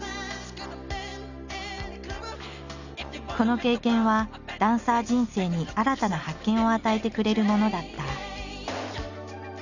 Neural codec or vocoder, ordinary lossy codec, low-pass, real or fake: none; none; 7.2 kHz; real